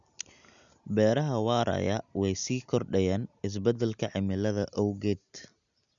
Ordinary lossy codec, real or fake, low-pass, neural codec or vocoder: none; real; 7.2 kHz; none